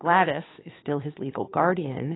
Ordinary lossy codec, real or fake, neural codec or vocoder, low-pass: AAC, 16 kbps; fake; codec, 16 kHz, 2 kbps, FunCodec, trained on Chinese and English, 25 frames a second; 7.2 kHz